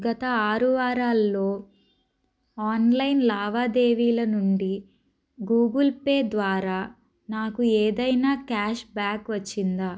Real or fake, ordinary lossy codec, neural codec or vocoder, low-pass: real; none; none; none